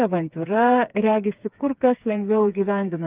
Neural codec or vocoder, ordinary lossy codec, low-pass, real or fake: codec, 16 kHz, 4 kbps, FreqCodec, smaller model; Opus, 24 kbps; 3.6 kHz; fake